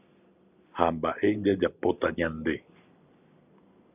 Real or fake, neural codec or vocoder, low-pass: real; none; 3.6 kHz